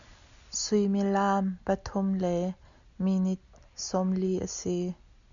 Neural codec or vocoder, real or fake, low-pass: none; real; 7.2 kHz